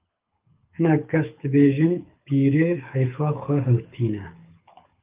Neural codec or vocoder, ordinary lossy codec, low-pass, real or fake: codec, 24 kHz, 6 kbps, HILCodec; Opus, 24 kbps; 3.6 kHz; fake